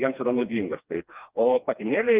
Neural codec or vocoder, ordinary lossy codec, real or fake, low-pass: codec, 16 kHz, 2 kbps, FreqCodec, smaller model; Opus, 24 kbps; fake; 3.6 kHz